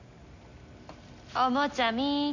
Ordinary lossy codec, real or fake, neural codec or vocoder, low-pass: none; real; none; 7.2 kHz